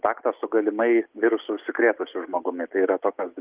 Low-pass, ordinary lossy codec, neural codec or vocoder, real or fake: 3.6 kHz; Opus, 32 kbps; none; real